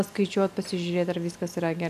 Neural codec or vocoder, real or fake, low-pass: none; real; 14.4 kHz